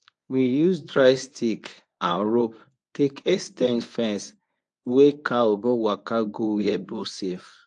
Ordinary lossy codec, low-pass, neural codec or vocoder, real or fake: AAC, 64 kbps; 10.8 kHz; codec, 24 kHz, 0.9 kbps, WavTokenizer, medium speech release version 1; fake